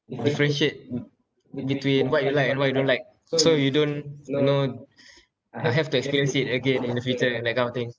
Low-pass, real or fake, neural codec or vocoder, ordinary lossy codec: none; real; none; none